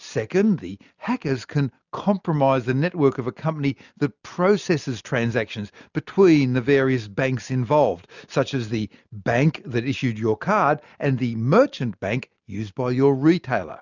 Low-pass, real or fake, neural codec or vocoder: 7.2 kHz; real; none